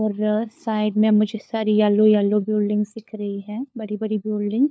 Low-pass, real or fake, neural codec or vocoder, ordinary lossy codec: none; fake; codec, 16 kHz, 4 kbps, FunCodec, trained on LibriTTS, 50 frames a second; none